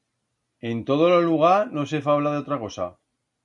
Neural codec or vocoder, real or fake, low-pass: none; real; 10.8 kHz